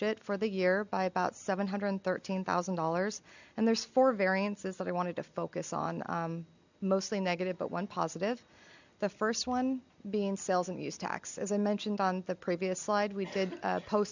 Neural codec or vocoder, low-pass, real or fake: none; 7.2 kHz; real